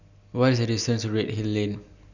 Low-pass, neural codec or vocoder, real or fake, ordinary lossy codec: 7.2 kHz; none; real; none